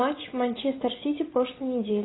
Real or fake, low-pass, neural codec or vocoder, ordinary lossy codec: real; 7.2 kHz; none; AAC, 16 kbps